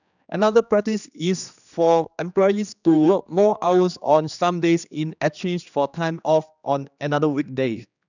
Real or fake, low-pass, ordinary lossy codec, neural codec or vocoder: fake; 7.2 kHz; none; codec, 16 kHz, 2 kbps, X-Codec, HuBERT features, trained on general audio